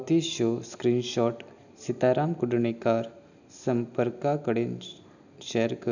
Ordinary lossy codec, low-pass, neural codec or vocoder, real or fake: none; 7.2 kHz; none; real